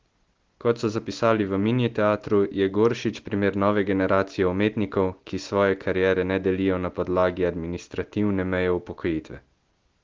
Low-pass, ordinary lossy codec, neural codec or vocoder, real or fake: 7.2 kHz; Opus, 16 kbps; none; real